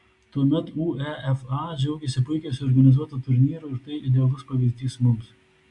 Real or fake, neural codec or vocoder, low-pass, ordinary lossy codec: real; none; 10.8 kHz; AAC, 64 kbps